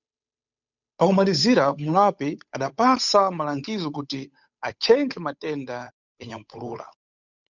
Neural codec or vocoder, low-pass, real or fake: codec, 16 kHz, 8 kbps, FunCodec, trained on Chinese and English, 25 frames a second; 7.2 kHz; fake